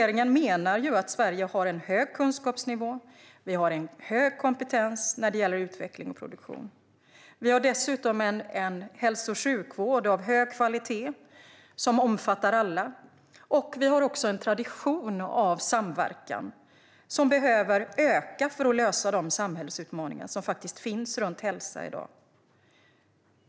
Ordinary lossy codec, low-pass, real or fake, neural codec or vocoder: none; none; real; none